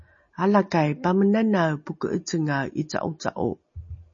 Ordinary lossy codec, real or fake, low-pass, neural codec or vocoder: MP3, 32 kbps; real; 7.2 kHz; none